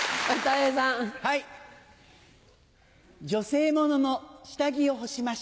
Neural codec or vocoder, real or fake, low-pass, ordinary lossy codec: none; real; none; none